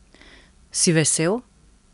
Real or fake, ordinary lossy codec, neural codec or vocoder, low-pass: real; none; none; 10.8 kHz